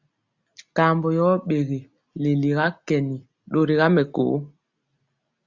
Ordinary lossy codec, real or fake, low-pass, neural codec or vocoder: Opus, 64 kbps; real; 7.2 kHz; none